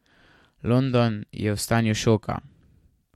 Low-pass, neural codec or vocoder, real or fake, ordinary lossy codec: 19.8 kHz; vocoder, 44.1 kHz, 128 mel bands every 512 samples, BigVGAN v2; fake; MP3, 64 kbps